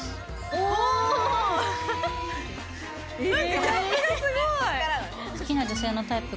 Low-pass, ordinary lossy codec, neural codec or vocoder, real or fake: none; none; none; real